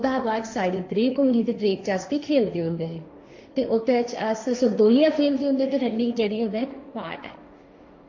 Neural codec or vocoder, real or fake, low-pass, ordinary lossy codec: codec, 16 kHz, 1.1 kbps, Voila-Tokenizer; fake; 7.2 kHz; AAC, 48 kbps